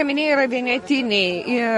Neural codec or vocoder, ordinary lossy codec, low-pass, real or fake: none; MP3, 48 kbps; 19.8 kHz; real